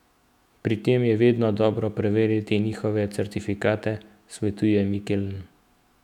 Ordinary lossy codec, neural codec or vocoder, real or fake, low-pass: none; autoencoder, 48 kHz, 128 numbers a frame, DAC-VAE, trained on Japanese speech; fake; 19.8 kHz